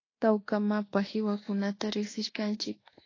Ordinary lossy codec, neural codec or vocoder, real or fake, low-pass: AAC, 32 kbps; codec, 24 kHz, 1.2 kbps, DualCodec; fake; 7.2 kHz